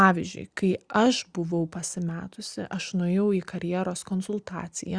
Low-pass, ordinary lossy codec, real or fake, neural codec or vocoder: 9.9 kHz; Opus, 64 kbps; real; none